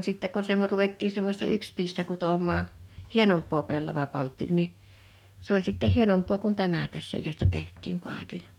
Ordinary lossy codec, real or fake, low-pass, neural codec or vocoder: none; fake; 19.8 kHz; codec, 44.1 kHz, 2.6 kbps, DAC